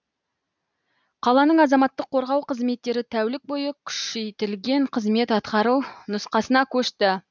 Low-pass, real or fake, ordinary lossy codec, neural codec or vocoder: 7.2 kHz; real; none; none